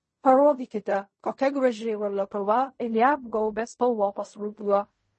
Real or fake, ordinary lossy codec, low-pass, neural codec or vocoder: fake; MP3, 32 kbps; 10.8 kHz; codec, 16 kHz in and 24 kHz out, 0.4 kbps, LongCat-Audio-Codec, fine tuned four codebook decoder